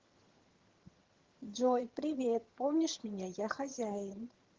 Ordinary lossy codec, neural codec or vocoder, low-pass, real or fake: Opus, 16 kbps; vocoder, 22.05 kHz, 80 mel bands, HiFi-GAN; 7.2 kHz; fake